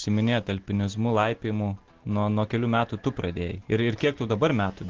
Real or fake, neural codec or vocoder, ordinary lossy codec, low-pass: real; none; Opus, 16 kbps; 7.2 kHz